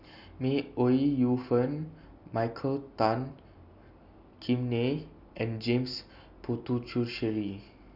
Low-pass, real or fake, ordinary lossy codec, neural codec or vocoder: 5.4 kHz; real; none; none